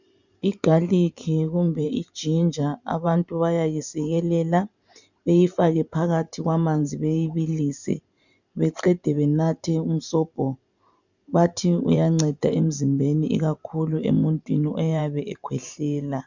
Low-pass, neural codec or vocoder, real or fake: 7.2 kHz; vocoder, 44.1 kHz, 128 mel bands every 512 samples, BigVGAN v2; fake